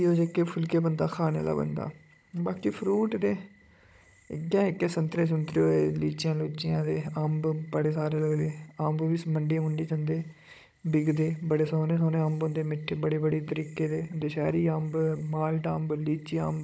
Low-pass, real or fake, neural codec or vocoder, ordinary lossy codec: none; fake; codec, 16 kHz, 16 kbps, FunCodec, trained on Chinese and English, 50 frames a second; none